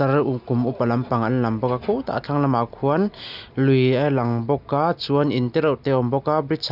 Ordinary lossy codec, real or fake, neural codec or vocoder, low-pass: none; real; none; 5.4 kHz